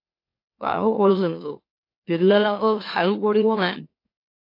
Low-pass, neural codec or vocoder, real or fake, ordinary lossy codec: 5.4 kHz; autoencoder, 44.1 kHz, a latent of 192 numbers a frame, MeloTTS; fake; AAC, 32 kbps